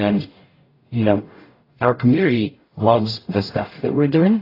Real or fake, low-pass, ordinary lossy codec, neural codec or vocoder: fake; 5.4 kHz; AAC, 24 kbps; codec, 44.1 kHz, 0.9 kbps, DAC